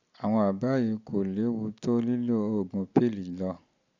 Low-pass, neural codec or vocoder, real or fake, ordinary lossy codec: 7.2 kHz; none; real; none